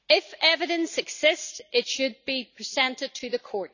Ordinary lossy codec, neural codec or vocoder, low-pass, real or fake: none; none; 7.2 kHz; real